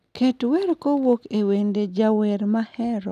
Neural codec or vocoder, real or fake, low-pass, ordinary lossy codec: none; real; 14.4 kHz; none